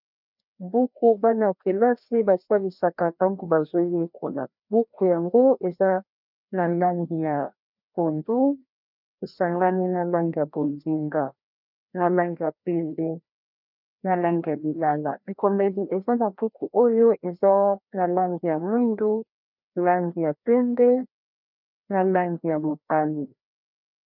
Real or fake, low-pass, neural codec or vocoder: fake; 5.4 kHz; codec, 16 kHz, 1 kbps, FreqCodec, larger model